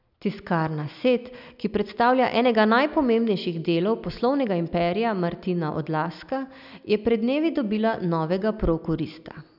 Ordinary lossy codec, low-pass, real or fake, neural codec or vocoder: none; 5.4 kHz; real; none